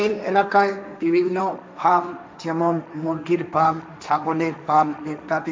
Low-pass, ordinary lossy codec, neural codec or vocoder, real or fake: none; none; codec, 16 kHz, 1.1 kbps, Voila-Tokenizer; fake